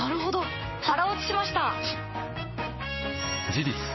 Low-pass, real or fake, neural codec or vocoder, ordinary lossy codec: 7.2 kHz; fake; codec, 16 kHz, 6 kbps, DAC; MP3, 24 kbps